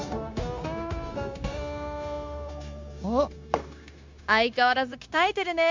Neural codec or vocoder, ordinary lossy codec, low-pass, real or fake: codec, 16 kHz, 0.9 kbps, LongCat-Audio-Codec; MP3, 64 kbps; 7.2 kHz; fake